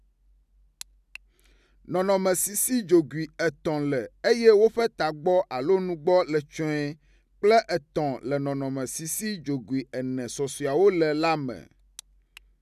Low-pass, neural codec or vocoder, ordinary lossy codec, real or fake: 14.4 kHz; none; none; real